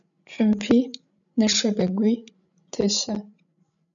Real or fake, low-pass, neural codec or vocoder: fake; 7.2 kHz; codec, 16 kHz, 16 kbps, FreqCodec, larger model